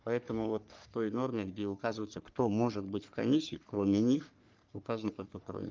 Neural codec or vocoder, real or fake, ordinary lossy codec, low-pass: codec, 44.1 kHz, 3.4 kbps, Pupu-Codec; fake; Opus, 24 kbps; 7.2 kHz